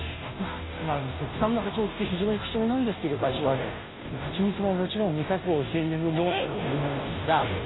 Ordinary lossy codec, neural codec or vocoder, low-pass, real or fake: AAC, 16 kbps; codec, 16 kHz, 0.5 kbps, FunCodec, trained on Chinese and English, 25 frames a second; 7.2 kHz; fake